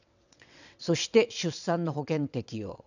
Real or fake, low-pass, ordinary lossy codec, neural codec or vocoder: fake; 7.2 kHz; none; vocoder, 22.05 kHz, 80 mel bands, WaveNeXt